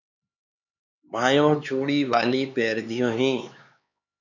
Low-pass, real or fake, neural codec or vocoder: 7.2 kHz; fake; codec, 16 kHz, 4 kbps, X-Codec, HuBERT features, trained on LibriSpeech